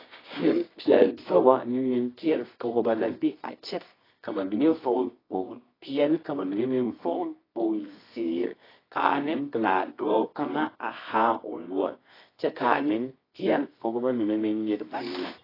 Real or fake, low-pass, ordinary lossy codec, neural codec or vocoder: fake; 5.4 kHz; AAC, 24 kbps; codec, 24 kHz, 0.9 kbps, WavTokenizer, medium music audio release